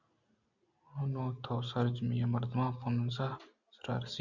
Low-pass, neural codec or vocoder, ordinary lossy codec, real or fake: 7.2 kHz; none; Opus, 64 kbps; real